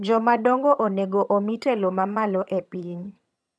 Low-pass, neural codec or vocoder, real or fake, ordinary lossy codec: none; vocoder, 22.05 kHz, 80 mel bands, HiFi-GAN; fake; none